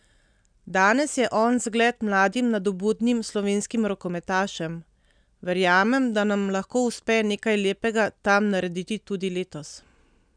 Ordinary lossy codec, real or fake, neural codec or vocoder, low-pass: none; real; none; 9.9 kHz